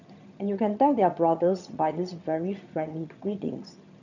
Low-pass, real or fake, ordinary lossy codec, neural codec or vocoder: 7.2 kHz; fake; none; vocoder, 22.05 kHz, 80 mel bands, HiFi-GAN